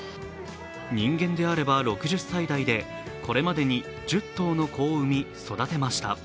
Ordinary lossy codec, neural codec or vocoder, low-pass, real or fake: none; none; none; real